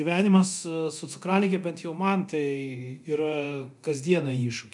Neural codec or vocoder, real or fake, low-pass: codec, 24 kHz, 0.9 kbps, DualCodec; fake; 10.8 kHz